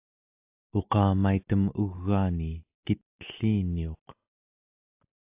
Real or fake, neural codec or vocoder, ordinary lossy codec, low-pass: real; none; AAC, 32 kbps; 3.6 kHz